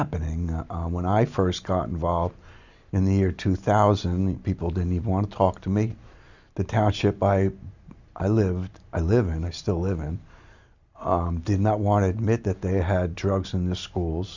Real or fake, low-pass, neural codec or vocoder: real; 7.2 kHz; none